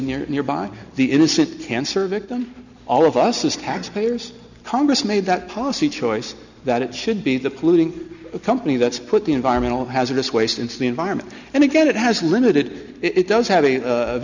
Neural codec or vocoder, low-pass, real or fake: none; 7.2 kHz; real